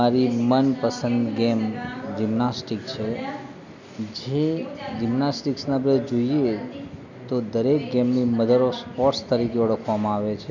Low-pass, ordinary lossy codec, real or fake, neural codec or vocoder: 7.2 kHz; none; real; none